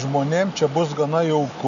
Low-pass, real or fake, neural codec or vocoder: 7.2 kHz; real; none